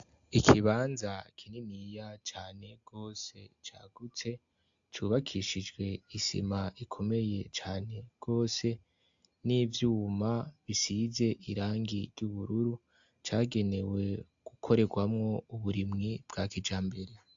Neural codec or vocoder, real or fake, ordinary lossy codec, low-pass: none; real; AAC, 64 kbps; 7.2 kHz